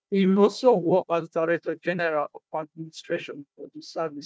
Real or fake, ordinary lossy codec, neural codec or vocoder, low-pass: fake; none; codec, 16 kHz, 1 kbps, FunCodec, trained on Chinese and English, 50 frames a second; none